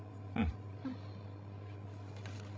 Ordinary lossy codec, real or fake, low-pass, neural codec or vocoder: none; fake; none; codec, 16 kHz, 16 kbps, FreqCodec, larger model